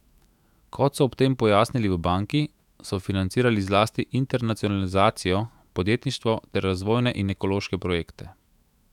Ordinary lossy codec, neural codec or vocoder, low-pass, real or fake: none; autoencoder, 48 kHz, 128 numbers a frame, DAC-VAE, trained on Japanese speech; 19.8 kHz; fake